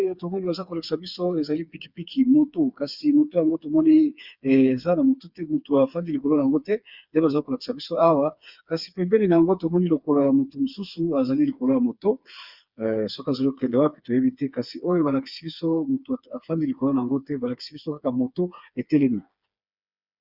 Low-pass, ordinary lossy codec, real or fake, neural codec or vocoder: 5.4 kHz; Opus, 64 kbps; fake; codec, 16 kHz, 4 kbps, FreqCodec, smaller model